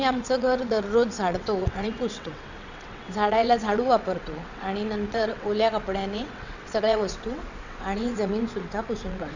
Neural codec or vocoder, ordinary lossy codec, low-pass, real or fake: vocoder, 22.05 kHz, 80 mel bands, WaveNeXt; none; 7.2 kHz; fake